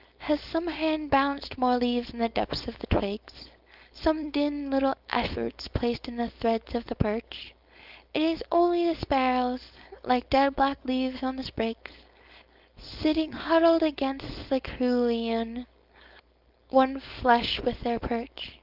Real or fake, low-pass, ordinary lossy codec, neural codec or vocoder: fake; 5.4 kHz; Opus, 24 kbps; codec, 16 kHz, 4.8 kbps, FACodec